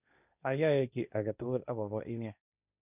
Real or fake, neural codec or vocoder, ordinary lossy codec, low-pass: fake; codec, 16 kHz, 1.1 kbps, Voila-Tokenizer; none; 3.6 kHz